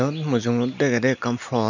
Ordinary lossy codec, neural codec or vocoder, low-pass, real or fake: none; none; 7.2 kHz; real